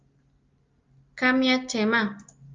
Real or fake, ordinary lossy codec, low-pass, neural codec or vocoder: real; Opus, 32 kbps; 7.2 kHz; none